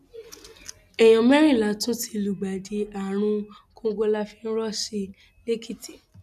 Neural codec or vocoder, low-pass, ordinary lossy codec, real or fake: none; 14.4 kHz; none; real